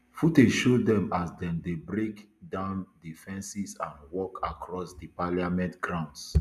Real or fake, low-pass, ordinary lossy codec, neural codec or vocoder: real; 14.4 kHz; none; none